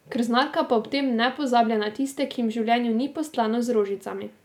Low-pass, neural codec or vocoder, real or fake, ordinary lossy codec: 19.8 kHz; none; real; none